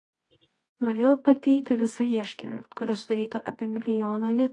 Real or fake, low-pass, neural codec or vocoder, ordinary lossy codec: fake; 10.8 kHz; codec, 24 kHz, 0.9 kbps, WavTokenizer, medium music audio release; AAC, 48 kbps